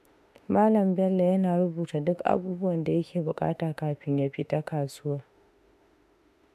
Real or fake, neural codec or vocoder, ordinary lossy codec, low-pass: fake; autoencoder, 48 kHz, 32 numbers a frame, DAC-VAE, trained on Japanese speech; MP3, 96 kbps; 14.4 kHz